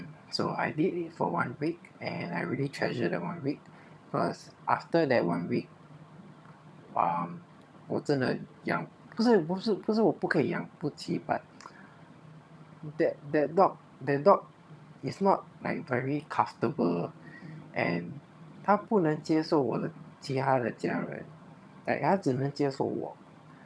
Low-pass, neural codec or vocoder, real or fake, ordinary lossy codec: none; vocoder, 22.05 kHz, 80 mel bands, HiFi-GAN; fake; none